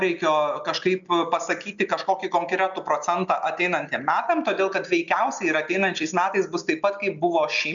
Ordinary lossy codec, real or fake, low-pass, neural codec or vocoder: MP3, 96 kbps; real; 7.2 kHz; none